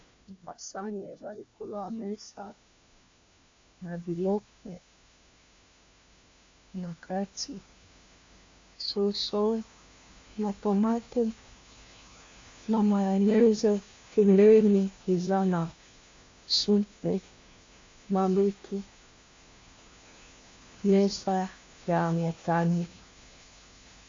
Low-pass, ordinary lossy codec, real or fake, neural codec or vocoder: 7.2 kHz; AAC, 48 kbps; fake; codec, 16 kHz, 1 kbps, FunCodec, trained on LibriTTS, 50 frames a second